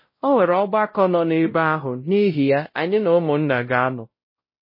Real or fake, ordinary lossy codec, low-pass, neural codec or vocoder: fake; MP3, 24 kbps; 5.4 kHz; codec, 16 kHz, 0.5 kbps, X-Codec, WavLM features, trained on Multilingual LibriSpeech